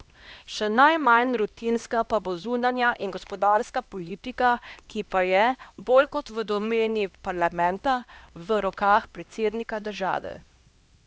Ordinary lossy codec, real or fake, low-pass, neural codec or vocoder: none; fake; none; codec, 16 kHz, 1 kbps, X-Codec, HuBERT features, trained on LibriSpeech